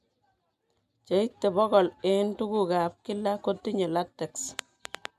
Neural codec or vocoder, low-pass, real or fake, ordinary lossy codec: none; 14.4 kHz; real; MP3, 96 kbps